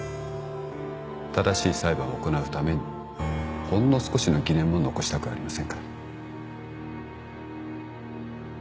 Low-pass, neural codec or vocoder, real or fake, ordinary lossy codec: none; none; real; none